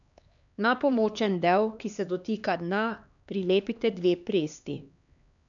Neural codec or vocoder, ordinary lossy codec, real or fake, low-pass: codec, 16 kHz, 2 kbps, X-Codec, HuBERT features, trained on LibriSpeech; none; fake; 7.2 kHz